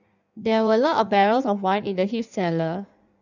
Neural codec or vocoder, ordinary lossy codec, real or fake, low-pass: codec, 16 kHz in and 24 kHz out, 1.1 kbps, FireRedTTS-2 codec; none; fake; 7.2 kHz